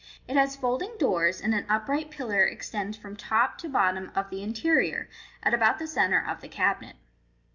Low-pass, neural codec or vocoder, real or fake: 7.2 kHz; none; real